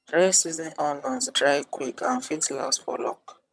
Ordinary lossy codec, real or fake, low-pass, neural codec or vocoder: none; fake; none; vocoder, 22.05 kHz, 80 mel bands, HiFi-GAN